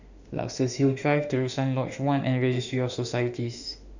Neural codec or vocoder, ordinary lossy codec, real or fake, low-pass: autoencoder, 48 kHz, 32 numbers a frame, DAC-VAE, trained on Japanese speech; none; fake; 7.2 kHz